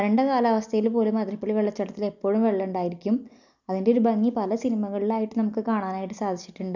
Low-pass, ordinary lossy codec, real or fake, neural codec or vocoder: 7.2 kHz; none; real; none